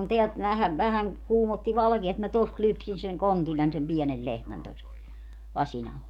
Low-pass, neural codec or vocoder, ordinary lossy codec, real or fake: 19.8 kHz; codec, 44.1 kHz, 7.8 kbps, DAC; none; fake